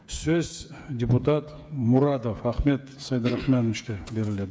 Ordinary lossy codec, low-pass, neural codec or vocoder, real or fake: none; none; codec, 16 kHz, 8 kbps, FreqCodec, smaller model; fake